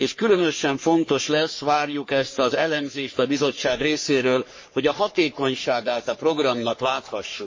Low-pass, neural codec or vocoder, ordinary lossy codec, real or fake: 7.2 kHz; codec, 44.1 kHz, 3.4 kbps, Pupu-Codec; MP3, 32 kbps; fake